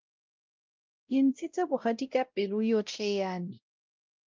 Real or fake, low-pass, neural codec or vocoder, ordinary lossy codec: fake; 7.2 kHz; codec, 16 kHz, 0.5 kbps, X-Codec, WavLM features, trained on Multilingual LibriSpeech; Opus, 32 kbps